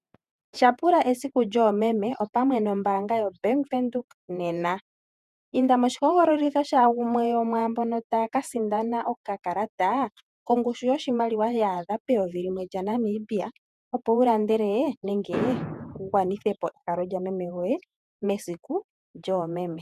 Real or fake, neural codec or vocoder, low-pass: fake; vocoder, 48 kHz, 128 mel bands, Vocos; 14.4 kHz